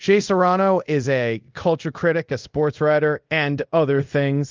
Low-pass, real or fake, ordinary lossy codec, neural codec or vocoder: 7.2 kHz; fake; Opus, 24 kbps; codec, 24 kHz, 0.5 kbps, DualCodec